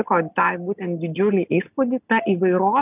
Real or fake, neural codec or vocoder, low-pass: real; none; 3.6 kHz